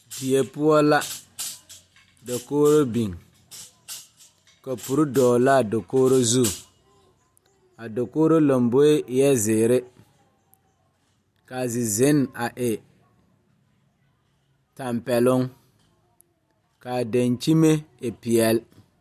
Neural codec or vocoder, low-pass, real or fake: none; 14.4 kHz; real